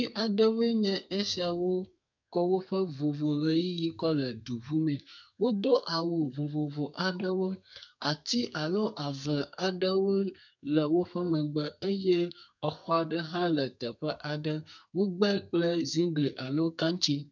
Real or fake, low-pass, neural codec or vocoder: fake; 7.2 kHz; codec, 32 kHz, 1.9 kbps, SNAC